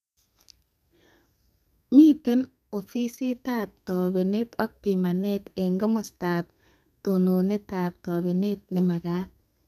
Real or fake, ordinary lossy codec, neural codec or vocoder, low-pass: fake; none; codec, 32 kHz, 1.9 kbps, SNAC; 14.4 kHz